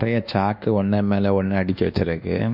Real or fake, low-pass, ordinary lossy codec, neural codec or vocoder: fake; 5.4 kHz; none; codec, 24 kHz, 1.2 kbps, DualCodec